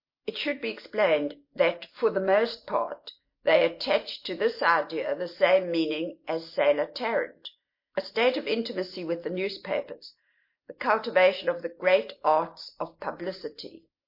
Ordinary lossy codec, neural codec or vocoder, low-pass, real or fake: MP3, 32 kbps; none; 5.4 kHz; real